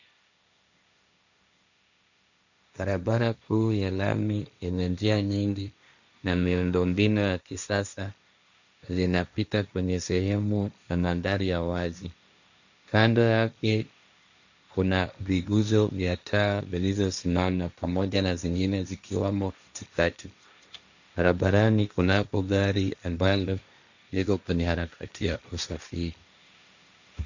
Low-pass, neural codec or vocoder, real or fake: 7.2 kHz; codec, 16 kHz, 1.1 kbps, Voila-Tokenizer; fake